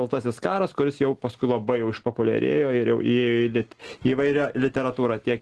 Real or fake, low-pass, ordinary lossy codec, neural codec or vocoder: real; 10.8 kHz; Opus, 16 kbps; none